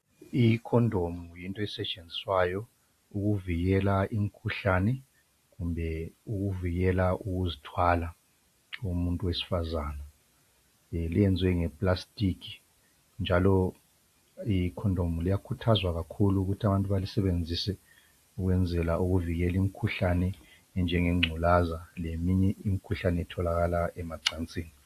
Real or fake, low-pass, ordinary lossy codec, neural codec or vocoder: real; 14.4 kHz; AAC, 64 kbps; none